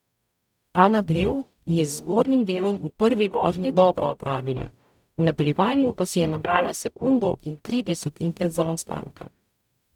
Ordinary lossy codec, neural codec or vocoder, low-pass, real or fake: none; codec, 44.1 kHz, 0.9 kbps, DAC; 19.8 kHz; fake